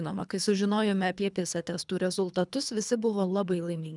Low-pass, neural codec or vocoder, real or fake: 10.8 kHz; codec, 24 kHz, 3 kbps, HILCodec; fake